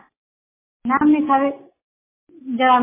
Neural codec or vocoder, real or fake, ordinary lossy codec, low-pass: none; real; MP3, 16 kbps; 3.6 kHz